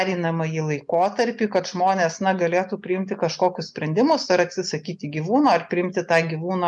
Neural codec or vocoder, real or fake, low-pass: none; real; 10.8 kHz